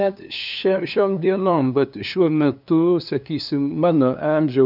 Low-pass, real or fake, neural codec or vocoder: 5.4 kHz; fake; codec, 16 kHz, 2 kbps, FunCodec, trained on LibriTTS, 25 frames a second